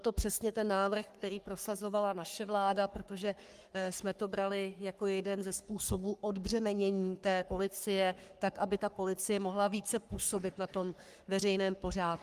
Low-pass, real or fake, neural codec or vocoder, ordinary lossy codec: 14.4 kHz; fake; codec, 44.1 kHz, 3.4 kbps, Pupu-Codec; Opus, 32 kbps